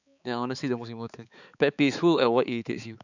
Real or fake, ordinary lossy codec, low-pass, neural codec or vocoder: fake; none; 7.2 kHz; codec, 16 kHz, 4 kbps, X-Codec, HuBERT features, trained on balanced general audio